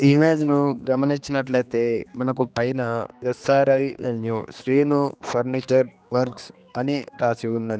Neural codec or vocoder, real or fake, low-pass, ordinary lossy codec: codec, 16 kHz, 2 kbps, X-Codec, HuBERT features, trained on general audio; fake; none; none